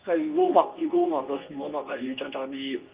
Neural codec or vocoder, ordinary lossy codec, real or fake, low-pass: codec, 24 kHz, 0.9 kbps, WavTokenizer, medium speech release version 2; Opus, 32 kbps; fake; 3.6 kHz